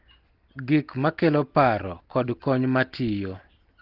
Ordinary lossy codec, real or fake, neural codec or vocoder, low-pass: Opus, 16 kbps; real; none; 5.4 kHz